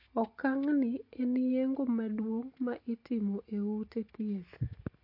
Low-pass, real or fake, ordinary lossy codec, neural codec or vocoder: 5.4 kHz; real; MP3, 32 kbps; none